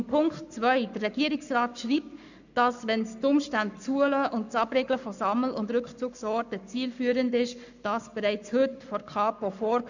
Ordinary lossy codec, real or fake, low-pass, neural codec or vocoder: none; fake; 7.2 kHz; codec, 44.1 kHz, 7.8 kbps, Pupu-Codec